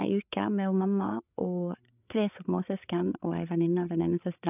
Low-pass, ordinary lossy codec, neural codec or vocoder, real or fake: 3.6 kHz; none; codec, 16 kHz, 8 kbps, FreqCodec, larger model; fake